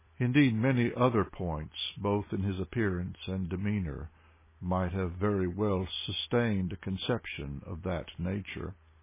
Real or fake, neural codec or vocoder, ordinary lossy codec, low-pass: real; none; MP3, 16 kbps; 3.6 kHz